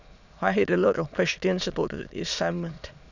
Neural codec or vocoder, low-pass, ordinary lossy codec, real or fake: autoencoder, 22.05 kHz, a latent of 192 numbers a frame, VITS, trained on many speakers; 7.2 kHz; none; fake